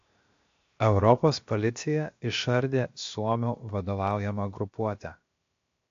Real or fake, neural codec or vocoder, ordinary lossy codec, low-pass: fake; codec, 16 kHz, 0.7 kbps, FocalCodec; AAC, 48 kbps; 7.2 kHz